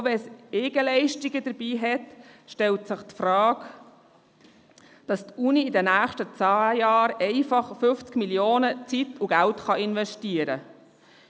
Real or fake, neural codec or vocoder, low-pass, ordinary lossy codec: real; none; none; none